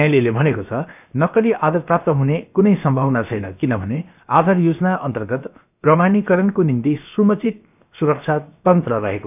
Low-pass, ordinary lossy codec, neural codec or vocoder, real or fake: 3.6 kHz; none; codec, 16 kHz, 0.7 kbps, FocalCodec; fake